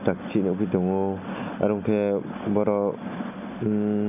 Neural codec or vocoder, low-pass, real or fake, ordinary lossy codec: none; 3.6 kHz; real; none